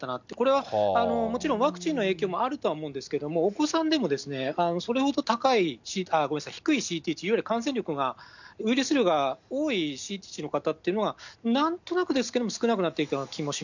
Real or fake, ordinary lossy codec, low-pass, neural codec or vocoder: real; none; 7.2 kHz; none